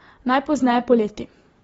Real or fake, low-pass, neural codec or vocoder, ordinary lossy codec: fake; 19.8 kHz; vocoder, 44.1 kHz, 128 mel bands every 512 samples, BigVGAN v2; AAC, 24 kbps